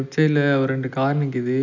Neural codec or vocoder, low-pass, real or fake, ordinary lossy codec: none; 7.2 kHz; real; none